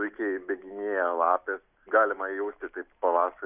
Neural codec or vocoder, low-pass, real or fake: none; 3.6 kHz; real